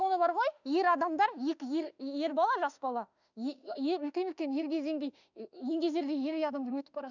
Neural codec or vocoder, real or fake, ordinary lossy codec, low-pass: autoencoder, 48 kHz, 32 numbers a frame, DAC-VAE, trained on Japanese speech; fake; none; 7.2 kHz